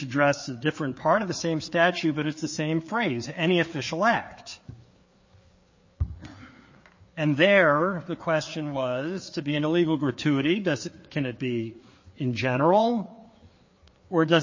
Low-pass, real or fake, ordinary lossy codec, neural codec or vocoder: 7.2 kHz; fake; MP3, 32 kbps; codec, 16 kHz, 4 kbps, FreqCodec, larger model